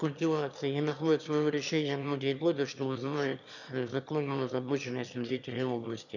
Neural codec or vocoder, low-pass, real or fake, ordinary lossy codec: autoencoder, 22.05 kHz, a latent of 192 numbers a frame, VITS, trained on one speaker; 7.2 kHz; fake; none